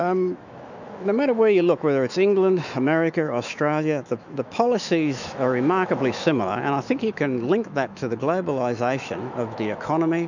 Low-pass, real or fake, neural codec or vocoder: 7.2 kHz; fake; autoencoder, 48 kHz, 128 numbers a frame, DAC-VAE, trained on Japanese speech